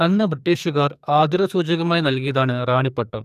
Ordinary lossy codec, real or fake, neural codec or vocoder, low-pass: none; fake; codec, 44.1 kHz, 2.6 kbps, SNAC; 14.4 kHz